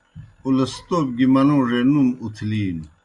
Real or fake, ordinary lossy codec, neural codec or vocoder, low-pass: real; Opus, 64 kbps; none; 10.8 kHz